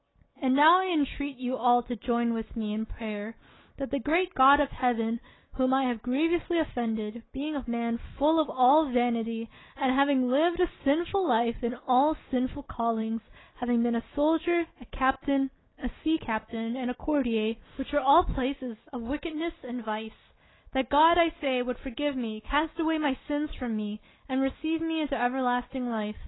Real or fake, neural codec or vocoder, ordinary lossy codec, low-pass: real; none; AAC, 16 kbps; 7.2 kHz